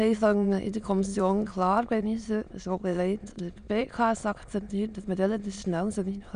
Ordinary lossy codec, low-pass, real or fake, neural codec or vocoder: none; 9.9 kHz; fake; autoencoder, 22.05 kHz, a latent of 192 numbers a frame, VITS, trained on many speakers